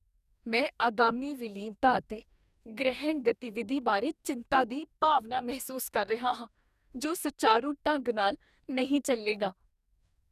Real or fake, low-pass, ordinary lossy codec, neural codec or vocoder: fake; 14.4 kHz; none; codec, 44.1 kHz, 2.6 kbps, DAC